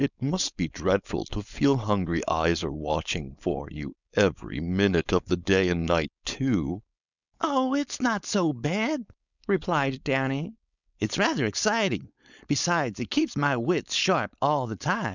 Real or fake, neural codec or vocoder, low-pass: fake; codec, 16 kHz, 4.8 kbps, FACodec; 7.2 kHz